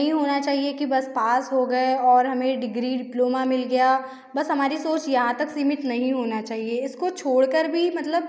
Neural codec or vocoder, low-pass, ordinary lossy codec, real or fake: none; none; none; real